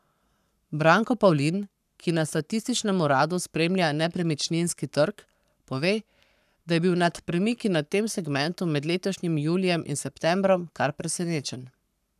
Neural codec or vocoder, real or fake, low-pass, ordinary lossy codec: codec, 44.1 kHz, 7.8 kbps, Pupu-Codec; fake; 14.4 kHz; none